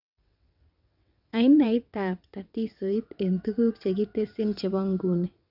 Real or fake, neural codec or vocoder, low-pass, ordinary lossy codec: fake; vocoder, 22.05 kHz, 80 mel bands, WaveNeXt; 5.4 kHz; none